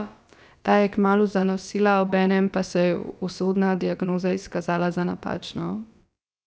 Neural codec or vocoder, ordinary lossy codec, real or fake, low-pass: codec, 16 kHz, about 1 kbps, DyCAST, with the encoder's durations; none; fake; none